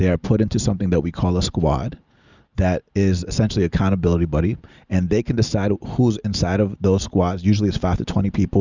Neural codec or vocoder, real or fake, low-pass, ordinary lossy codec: none; real; 7.2 kHz; Opus, 64 kbps